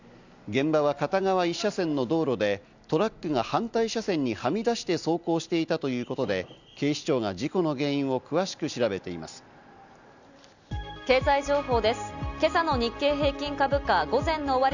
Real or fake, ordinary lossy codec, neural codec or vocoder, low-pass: real; none; none; 7.2 kHz